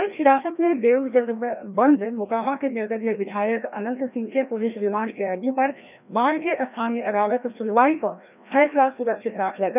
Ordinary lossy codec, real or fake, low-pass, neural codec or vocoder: none; fake; 3.6 kHz; codec, 16 kHz, 1 kbps, FreqCodec, larger model